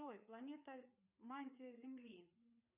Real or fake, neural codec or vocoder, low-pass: fake; codec, 16 kHz, 4 kbps, FreqCodec, larger model; 3.6 kHz